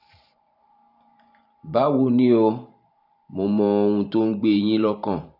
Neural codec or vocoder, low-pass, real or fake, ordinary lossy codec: vocoder, 44.1 kHz, 128 mel bands every 512 samples, BigVGAN v2; 5.4 kHz; fake; none